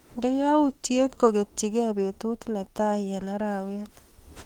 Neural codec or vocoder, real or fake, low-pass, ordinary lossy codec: autoencoder, 48 kHz, 32 numbers a frame, DAC-VAE, trained on Japanese speech; fake; 19.8 kHz; Opus, 24 kbps